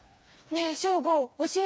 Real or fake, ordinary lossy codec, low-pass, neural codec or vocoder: fake; none; none; codec, 16 kHz, 2 kbps, FreqCodec, smaller model